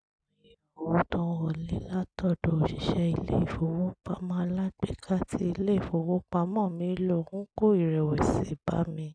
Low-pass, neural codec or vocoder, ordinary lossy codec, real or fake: 9.9 kHz; none; none; real